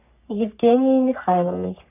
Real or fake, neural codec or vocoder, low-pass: fake; codec, 44.1 kHz, 3.4 kbps, Pupu-Codec; 3.6 kHz